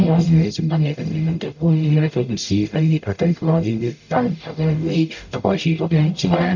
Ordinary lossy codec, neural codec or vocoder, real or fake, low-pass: none; codec, 44.1 kHz, 0.9 kbps, DAC; fake; 7.2 kHz